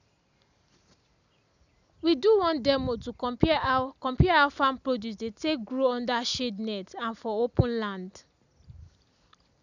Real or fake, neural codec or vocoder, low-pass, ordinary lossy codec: real; none; 7.2 kHz; none